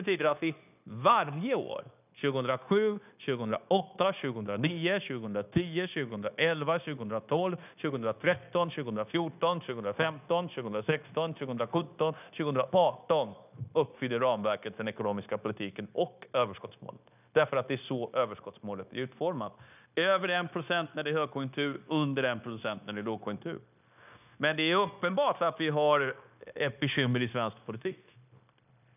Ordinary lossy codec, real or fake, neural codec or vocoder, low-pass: none; fake; codec, 16 kHz, 0.9 kbps, LongCat-Audio-Codec; 3.6 kHz